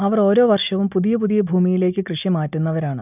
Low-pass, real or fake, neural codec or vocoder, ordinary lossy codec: 3.6 kHz; real; none; none